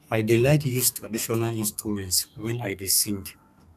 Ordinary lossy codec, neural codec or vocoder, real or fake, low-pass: none; codec, 32 kHz, 1.9 kbps, SNAC; fake; 14.4 kHz